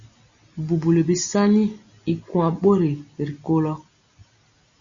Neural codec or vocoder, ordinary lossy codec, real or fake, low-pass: none; Opus, 64 kbps; real; 7.2 kHz